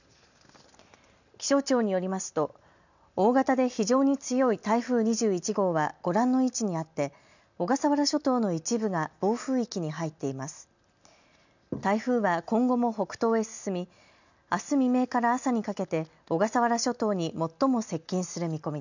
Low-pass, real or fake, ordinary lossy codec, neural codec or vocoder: 7.2 kHz; real; none; none